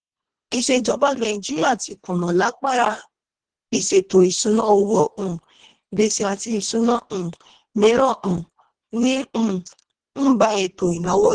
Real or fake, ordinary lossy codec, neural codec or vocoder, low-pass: fake; Opus, 16 kbps; codec, 24 kHz, 1.5 kbps, HILCodec; 9.9 kHz